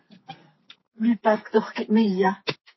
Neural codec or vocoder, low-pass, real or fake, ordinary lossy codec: codec, 32 kHz, 1.9 kbps, SNAC; 7.2 kHz; fake; MP3, 24 kbps